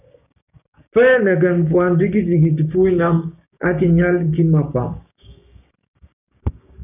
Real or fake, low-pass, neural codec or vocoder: fake; 3.6 kHz; codec, 16 kHz, 6 kbps, DAC